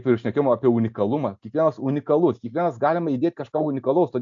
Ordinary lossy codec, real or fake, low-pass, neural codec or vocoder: AAC, 64 kbps; real; 7.2 kHz; none